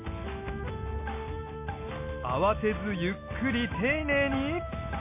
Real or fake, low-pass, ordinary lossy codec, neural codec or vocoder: real; 3.6 kHz; MP3, 24 kbps; none